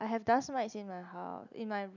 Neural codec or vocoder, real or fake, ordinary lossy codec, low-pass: none; real; none; 7.2 kHz